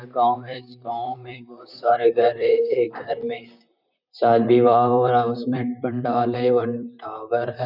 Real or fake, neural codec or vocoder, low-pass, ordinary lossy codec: fake; vocoder, 44.1 kHz, 128 mel bands, Pupu-Vocoder; 5.4 kHz; none